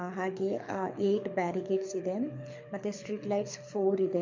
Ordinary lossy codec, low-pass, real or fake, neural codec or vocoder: MP3, 48 kbps; 7.2 kHz; fake; codec, 24 kHz, 6 kbps, HILCodec